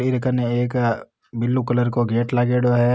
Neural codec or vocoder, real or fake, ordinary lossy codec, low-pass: none; real; none; none